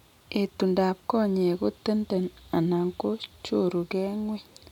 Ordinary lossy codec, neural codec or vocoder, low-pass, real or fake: none; none; 19.8 kHz; real